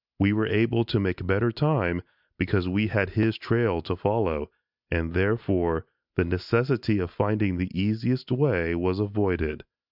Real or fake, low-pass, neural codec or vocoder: real; 5.4 kHz; none